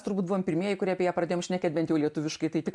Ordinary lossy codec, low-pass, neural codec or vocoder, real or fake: MP3, 64 kbps; 10.8 kHz; none; real